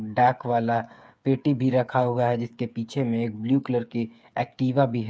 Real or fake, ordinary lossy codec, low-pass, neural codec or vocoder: fake; none; none; codec, 16 kHz, 8 kbps, FreqCodec, smaller model